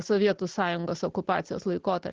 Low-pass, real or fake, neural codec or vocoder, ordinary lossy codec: 7.2 kHz; real; none; Opus, 16 kbps